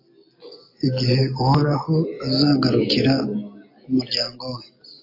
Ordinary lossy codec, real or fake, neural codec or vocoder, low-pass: AAC, 32 kbps; real; none; 5.4 kHz